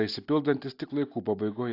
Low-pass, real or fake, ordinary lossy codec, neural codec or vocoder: 5.4 kHz; real; AAC, 32 kbps; none